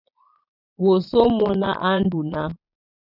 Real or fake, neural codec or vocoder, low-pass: fake; vocoder, 22.05 kHz, 80 mel bands, Vocos; 5.4 kHz